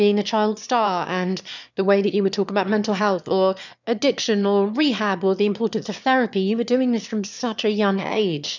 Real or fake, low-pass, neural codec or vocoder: fake; 7.2 kHz; autoencoder, 22.05 kHz, a latent of 192 numbers a frame, VITS, trained on one speaker